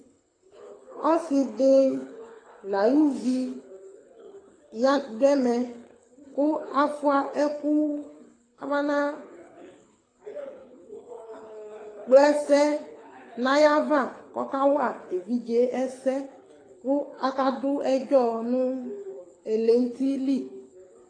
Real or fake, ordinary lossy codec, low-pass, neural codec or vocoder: fake; AAC, 32 kbps; 9.9 kHz; codec, 24 kHz, 6 kbps, HILCodec